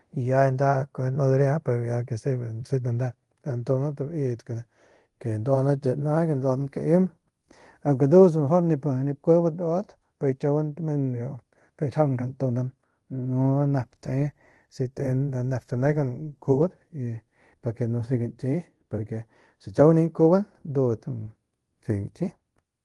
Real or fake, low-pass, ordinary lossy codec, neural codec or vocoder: fake; 10.8 kHz; Opus, 24 kbps; codec, 24 kHz, 0.5 kbps, DualCodec